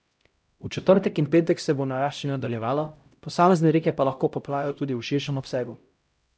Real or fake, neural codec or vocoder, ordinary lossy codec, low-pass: fake; codec, 16 kHz, 0.5 kbps, X-Codec, HuBERT features, trained on LibriSpeech; none; none